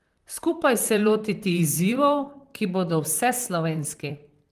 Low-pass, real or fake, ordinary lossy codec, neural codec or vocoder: 14.4 kHz; fake; Opus, 24 kbps; vocoder, 44.1 kHz, 128 mel bands, Pupu-Vocoder